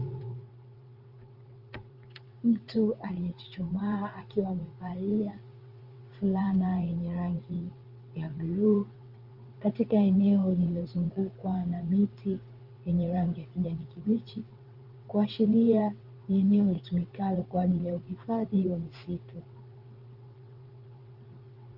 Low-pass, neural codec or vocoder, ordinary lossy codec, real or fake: 5.4 kHz; vocoder, 22.05 kHz, 80 mel bands, WaveNeXt; Opus, 32 kbps; fake